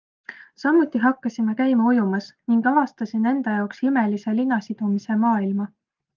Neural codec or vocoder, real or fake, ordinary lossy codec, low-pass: none; real; Opus, 32 kbps; 7.2 kHz